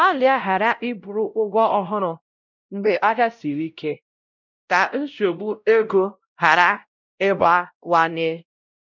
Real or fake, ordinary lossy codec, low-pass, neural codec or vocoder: fake; none; 7.2 kHz; codec, 16 kHz, 0.5 kbps, X-Codec, WavLM features, trained on Multilingual LibriSpeech